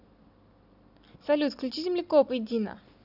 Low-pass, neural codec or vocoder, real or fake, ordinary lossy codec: 5.4 kHz; none; real; none